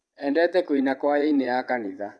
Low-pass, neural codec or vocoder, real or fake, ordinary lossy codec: none; vocoder, 22.05 kHz, 80 mel bands, Vocos; fake; none